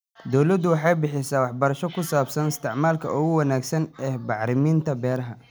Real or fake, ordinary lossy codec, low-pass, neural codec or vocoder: real; none; none; none